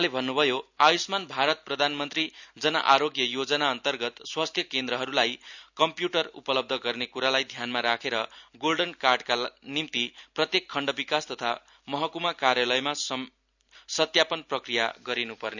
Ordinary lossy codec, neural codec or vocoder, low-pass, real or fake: none; none; 7.2 kHz; real